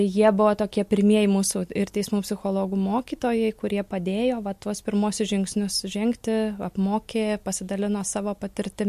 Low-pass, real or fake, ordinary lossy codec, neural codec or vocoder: 14.4 kHz; real; MP3, 64 kbps; none